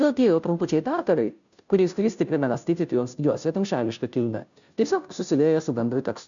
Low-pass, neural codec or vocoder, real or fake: 7.2 kHz; codec, 16 kHz, 0.5 kbps, FunCodec, trained on Chinese and English, 25 frames a second; fake